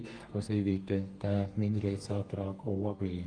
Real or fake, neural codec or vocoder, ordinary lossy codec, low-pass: fake; codec, 32 kHz, 1.9 kbps, SNAC; Opus, 32 kbps; 9.9 kHz